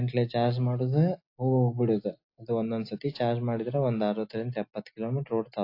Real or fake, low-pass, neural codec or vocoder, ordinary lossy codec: real; 5.4 kHz; none; AAC, 32 kbps